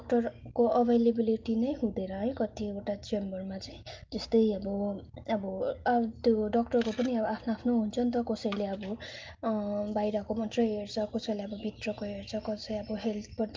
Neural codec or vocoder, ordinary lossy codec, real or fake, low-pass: none; Opus, 24 kbps; real; 7.2 kHz